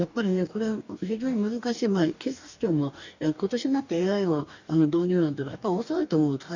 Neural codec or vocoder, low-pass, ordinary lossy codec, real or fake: codec, 44.1 kHz, 2.6 kbps, DAC; 7.2 kHz; none; fake